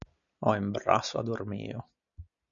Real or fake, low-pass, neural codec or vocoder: real; 7.2 kHz; none